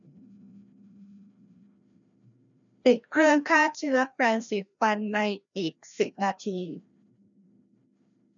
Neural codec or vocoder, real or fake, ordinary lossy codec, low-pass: codec, 16 kHz, 1 kbps, FreqCodec, larger model; fake; none; 7.2 kHz